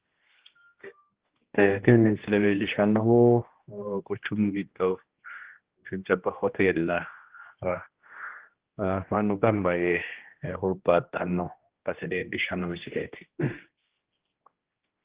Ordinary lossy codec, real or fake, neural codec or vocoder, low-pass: Opus, 16 kbps; fake; codec, 16 kHz, 1 kbps, X-Codec, HuBERT features, trained on general audio; 3.6 kHz